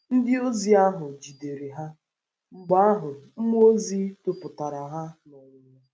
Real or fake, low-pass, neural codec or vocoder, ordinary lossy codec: real; none; none; none